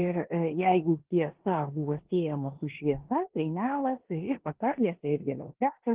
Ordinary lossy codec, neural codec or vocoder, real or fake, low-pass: Opus, 16 kbps; codec, 16 kHz in and 24 kHz out, 0.9 kbps, LongCat-Audio-Codec, four codebook decoder; fake; 3.6 kHz